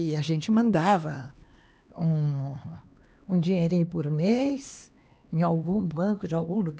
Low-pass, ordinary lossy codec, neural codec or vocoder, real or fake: none; none; codec, 16 kHz, 2 kbps, X-Codec, HuBERT features, trained on LibriSpeech; fake